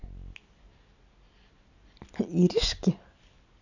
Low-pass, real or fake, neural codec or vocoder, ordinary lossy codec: 7.2 kHz; real; none; none